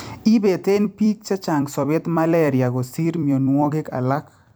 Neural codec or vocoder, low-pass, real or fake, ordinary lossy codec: vocoder, 44.1 kHz, 128 mel bands every 256 samples, BigVGAN v2; none; fake; none